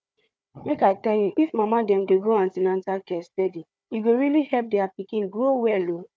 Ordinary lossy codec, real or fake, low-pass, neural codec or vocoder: none; fake; none; codec, 16 kHz, 4 kbps, FunCodec, trained on Chinese and English, 50 frames a second